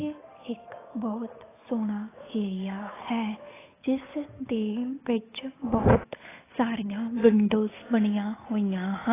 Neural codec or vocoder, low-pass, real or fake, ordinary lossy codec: codec, 16 kHz, 8 kbps, FunCodec, trained on Chinese and English, 25 frames a second; 3.6 kHz; fake; AAC, 16 kbps